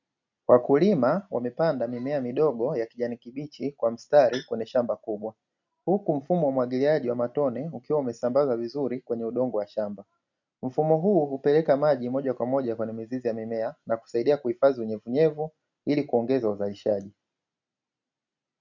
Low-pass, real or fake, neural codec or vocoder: 7.2 kHz; real; none